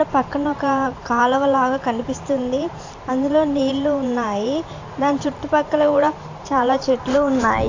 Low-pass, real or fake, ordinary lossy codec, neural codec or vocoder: 7.2 kHz; fake; AAC, 48 kbps; vocoder, 44.1 kHz, 128 mel bands every 512 samples, BigVGAN v2